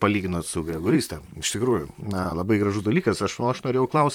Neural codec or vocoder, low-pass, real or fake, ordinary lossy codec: vocoder, 44.1 kHz, 128 mel bands, Pupu-Vocoder; 19.8 kHz; fake; MP3, 96 kbps